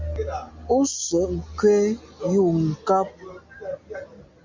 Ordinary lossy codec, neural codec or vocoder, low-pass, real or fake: MP3, 64 kbps; none; 7.2 kHz; real